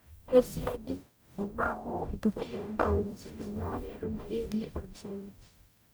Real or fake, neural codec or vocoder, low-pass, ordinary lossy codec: fake; codec, 44.1 kHz, 0.9 kbps, DAC; none; none